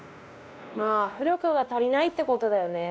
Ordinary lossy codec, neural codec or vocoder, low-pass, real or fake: none; codec, 16 kHz, 1 kbps, X-Codec, WavLM features, trained on Multilingual LibriSpeech; none; fake